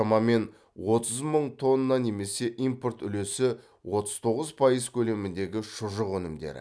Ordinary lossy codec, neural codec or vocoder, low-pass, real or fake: none; none; none; real